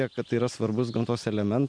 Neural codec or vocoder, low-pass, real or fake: none; 9.9 kHz; real